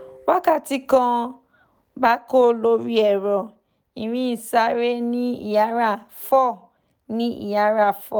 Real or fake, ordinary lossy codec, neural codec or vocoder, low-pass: real; none; none; none